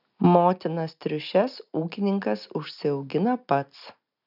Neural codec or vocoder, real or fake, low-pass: none; real; 5.4 kHz